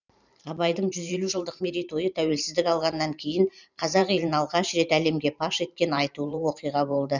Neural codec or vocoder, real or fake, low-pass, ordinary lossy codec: vocoder, 44.1 kHz, 128 mel bands every 512 samples, BigVGAN v2; fake; 7.2 kHz; none